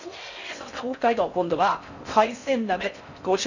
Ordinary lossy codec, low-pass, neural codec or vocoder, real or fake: none; 7.2 kHz; codec, 16 kHz in and 24 kHz out, 0.6 kbps, FocalCodec, streaming, 4096 codes; fake